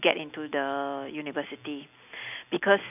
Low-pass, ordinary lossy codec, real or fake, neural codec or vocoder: 3.6 kHz; none; real; none